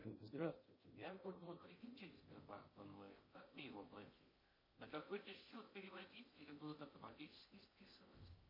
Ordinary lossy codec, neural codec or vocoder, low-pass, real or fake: MP3, 24 kbps; codec, 16 kHz in and 24 kHz out, 0.6 kbps, FocalCodec, streaming, 2048 codes; 5.4 kHz; fake